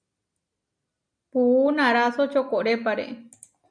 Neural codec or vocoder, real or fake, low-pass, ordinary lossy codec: none; real; 9.9 kHz; MP3, 96 kbps